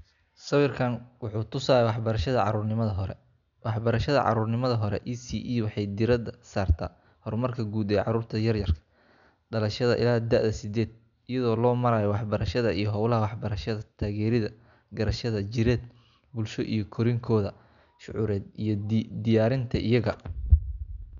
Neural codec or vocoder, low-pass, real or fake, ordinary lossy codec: none; 7.2 kHz; real; MP3, 96 kbps